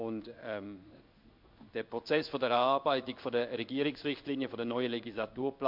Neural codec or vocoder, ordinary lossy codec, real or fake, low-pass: codec, 16 kHz in and 24 kHz out, 1 kbps, XY-Tokenizer; MP3, 48 kbps; fake; 5.4 kHz